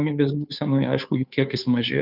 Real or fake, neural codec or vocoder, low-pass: fake; codec, 16 kHz, 2 kbps, FunCodec, trained on Chinese and English, 25 frames a second; 5.4 kHz